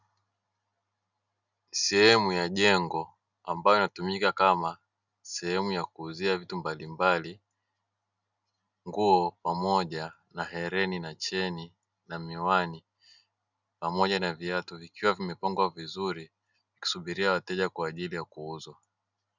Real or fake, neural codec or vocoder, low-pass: real; none; 7.2 kHz